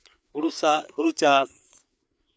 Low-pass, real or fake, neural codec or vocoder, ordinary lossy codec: none; fake; codec, 16 kHz, 4 kbps, FreqCodec, larger model; none